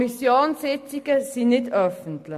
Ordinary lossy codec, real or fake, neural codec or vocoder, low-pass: MP3, 64 kbps; real; none; 14.4 kHz